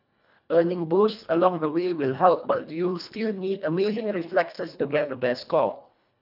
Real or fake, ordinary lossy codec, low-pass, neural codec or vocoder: fake; none; 5.4 kHz; codec, 24 kHz, 1.5 kbps, HILCodec